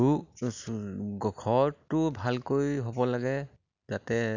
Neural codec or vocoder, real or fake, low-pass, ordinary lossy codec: none; real; 7.2 kHz; none